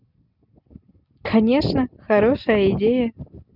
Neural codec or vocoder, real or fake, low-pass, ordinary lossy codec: none; real; 5.4 kHz; none